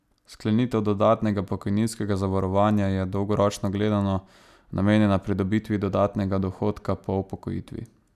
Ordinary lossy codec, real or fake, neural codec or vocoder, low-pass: none; real; none; 14.4 kHz